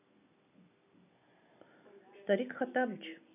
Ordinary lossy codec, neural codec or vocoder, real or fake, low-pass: none; none; real; 3.6 kHz